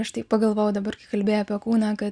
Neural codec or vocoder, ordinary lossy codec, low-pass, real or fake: none; Opus, 64 kbps; 9.9 kHz; real